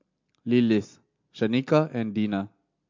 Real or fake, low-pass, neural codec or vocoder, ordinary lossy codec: real; 7.2 kHz; none; MP3, 48 kbps